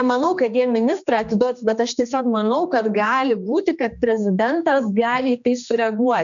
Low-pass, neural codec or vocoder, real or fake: 7.2 kHz; codec, 16 kHz, 2 kbps, X-Codec, HuBERT features, trained on general audio; fake